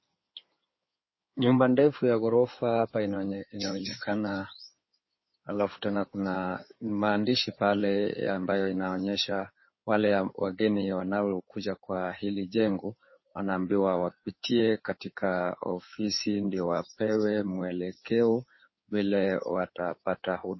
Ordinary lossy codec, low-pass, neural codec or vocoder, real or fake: MP3, 24 kbps; 7.2 kHz; codec, 16 kHz in and 24 kHz out, 2.2 kbps, FireRedTTS-2 codec; fake